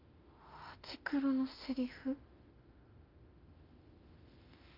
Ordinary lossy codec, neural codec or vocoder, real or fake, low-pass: Opus, 24 kbps; autoencoder, 48 kHz, 32 numbers a frame, DAC-VAE, trained on Japanese speech; fake; 5.4 kHz